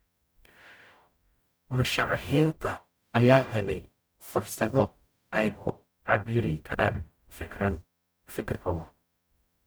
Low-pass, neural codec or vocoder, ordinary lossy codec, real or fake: none; codec, 44.1 kHz, 0.9 kbps, DAC; none; fake